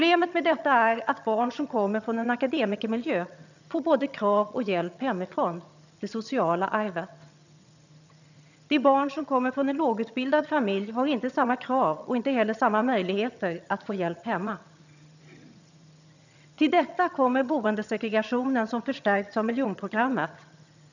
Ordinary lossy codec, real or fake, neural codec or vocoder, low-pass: none; fake; vocoder, 22.05 kHz, 80 mel bands, HiFi-GAN; 7.2 kHz